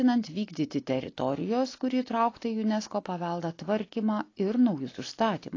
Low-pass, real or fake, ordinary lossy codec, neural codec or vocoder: 7.2 kHz; real; AAC, 32 kbps; none